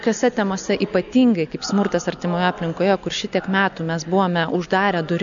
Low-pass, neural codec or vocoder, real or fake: 7.2 kHz; none; real